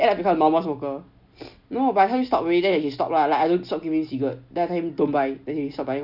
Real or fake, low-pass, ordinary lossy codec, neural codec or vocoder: real; 5.4 kHz; none; none